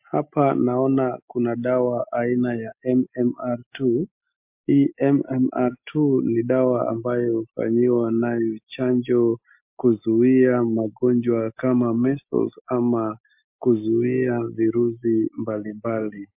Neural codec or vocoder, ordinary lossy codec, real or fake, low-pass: none; MP3, 32 kbps; real; 3.6 kHz